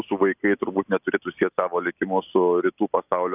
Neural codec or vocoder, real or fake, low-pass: none; real; 3.6 kHz